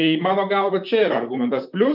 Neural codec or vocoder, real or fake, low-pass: vocoder, 44.1 kHz, 128 mel bands, Pupu-Vocoder; fake; 5.4 kHz